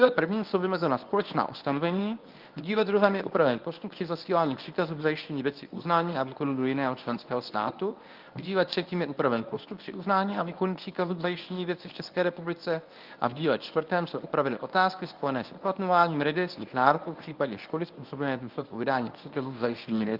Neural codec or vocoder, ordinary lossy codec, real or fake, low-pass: codec, 24 kHz, 0.9 kbps, WavTokenizer, medium speech release version 1; Opus, 32 kbps; fake; 5.4 kHz